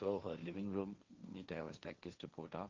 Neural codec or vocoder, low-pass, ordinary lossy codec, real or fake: codec, 16 kHz, 1.1 kbps, Voila-Tokenizer; 7.2 kHz; Opus, 32 kbps; fake